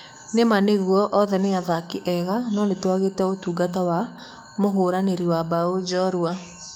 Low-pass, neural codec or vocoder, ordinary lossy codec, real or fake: 19.8 kHz; codec, 44.1 kHz, 7.8 kbps, DAC; none; fake